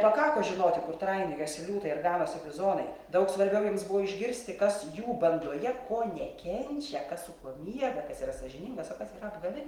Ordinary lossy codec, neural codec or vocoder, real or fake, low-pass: Opus, 24 kbps; none; real; 19.8 kHz